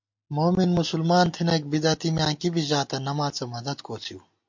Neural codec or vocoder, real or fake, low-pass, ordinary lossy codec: none; real; 7.2 kHz; MP3, 48 kbps